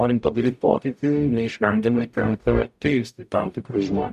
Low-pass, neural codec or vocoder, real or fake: 14.4 kHz; codec, 44.1 kHz, 0.9 kbps, DAC; fake